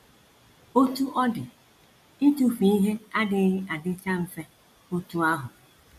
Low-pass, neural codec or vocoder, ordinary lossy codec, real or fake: 14.4 kHz; none; AAC, 96 kbps; real